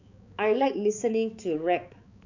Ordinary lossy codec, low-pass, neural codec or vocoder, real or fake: none; 7.2 kHz; codec, 16 kHz, 4 kbps, X-Codec, HuBERT features, trained on balanced general audio; fake